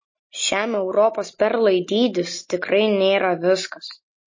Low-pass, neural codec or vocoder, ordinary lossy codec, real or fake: 7.2 kHz; none; MP3, 32 kbps; real